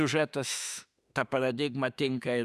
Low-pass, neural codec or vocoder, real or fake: 14.4 kHz; autoencoder, 48 kHz, 32 numbers a frame, DAC-VAE, trained on Japanese speech; fake